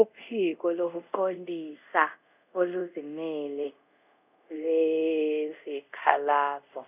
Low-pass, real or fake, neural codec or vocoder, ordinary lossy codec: 3.6 kHz; fake; codec, 24 kHz, 0.5 kbps, DualCodec; none